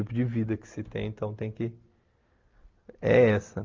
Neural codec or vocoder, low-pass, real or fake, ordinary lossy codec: none; 7.2 kHz; real; Opus, 24 kbps